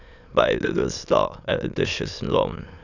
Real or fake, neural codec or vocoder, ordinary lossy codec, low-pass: fake; autoencoder, 22.05 kHz, a latent of 192 numbers a frame, VITS, trained on many speakers; none; 7.2 kHz